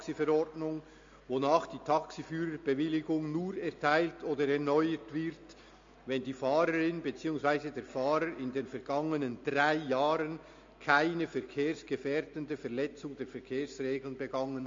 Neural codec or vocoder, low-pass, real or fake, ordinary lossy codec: none; 7.2 kHz; real; MP3, 48 kbps